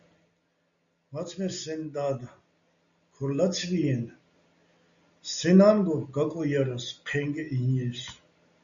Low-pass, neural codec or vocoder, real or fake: 7.2 kHz; none; real